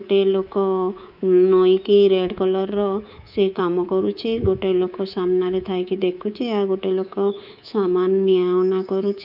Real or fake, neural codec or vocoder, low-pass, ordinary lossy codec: fake; codec, 24 kHz, 3.1 kbps, DualCodec; 5.4 kHz; none